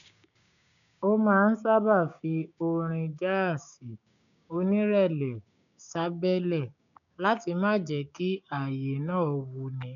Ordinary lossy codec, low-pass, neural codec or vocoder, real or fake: none; 7.2 kHz; codec, 16 kHz, 6 kbps, DAC; fake